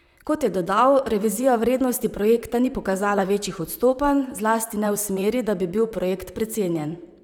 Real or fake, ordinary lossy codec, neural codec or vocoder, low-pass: fake; none; vocoder, 44.1 kHz, 128 mel bands, Pupu-Vocoder; 19.8 kHz